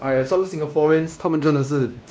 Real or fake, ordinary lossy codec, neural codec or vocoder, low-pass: fake; none; codec, 16 kHz, 1 kbps, X-Codec, WavLM features, trained on Multilingual LibriSpeech; none